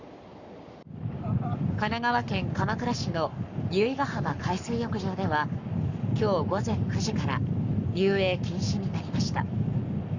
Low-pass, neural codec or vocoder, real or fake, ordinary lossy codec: 7.2 kHz; codec, 44.1 kHz, 7.8 kbps, Pupu-Codec; fake; none